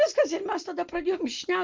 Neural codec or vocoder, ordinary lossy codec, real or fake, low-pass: none; Opus, 24 kbps; real; 7.2 kHz